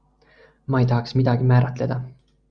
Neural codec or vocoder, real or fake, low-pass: none; real; 9.9 kHz